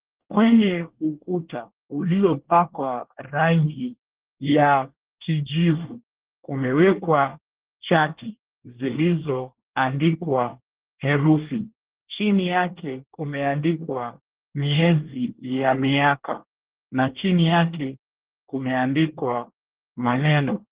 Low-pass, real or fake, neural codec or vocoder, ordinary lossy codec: 3.6 kHz; fake; codec, 24 kHz, 1 kbps, SNAC; Opus, 16 kbps